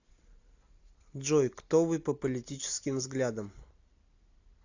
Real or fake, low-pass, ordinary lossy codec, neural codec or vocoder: real; 7.2 kHz; AAC, 48 kbps; none